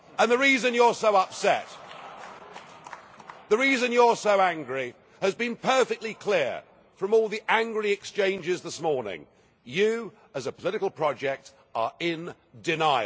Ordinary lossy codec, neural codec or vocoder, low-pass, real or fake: none; none; none; real